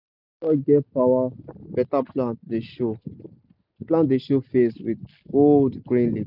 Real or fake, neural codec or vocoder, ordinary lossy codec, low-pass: real; none; none; 5.4 kHz